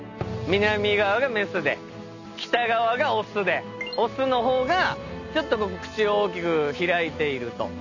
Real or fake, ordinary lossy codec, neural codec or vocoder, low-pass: real; none; none; 7.2 kHz